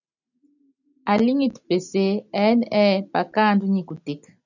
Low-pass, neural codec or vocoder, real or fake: 7.2 kHz; none; real